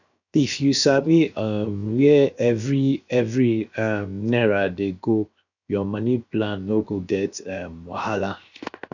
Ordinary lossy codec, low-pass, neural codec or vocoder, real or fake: none; 7.2 kHz; codec, 16 kHz, 0.7 kbps, FocalCodec; fake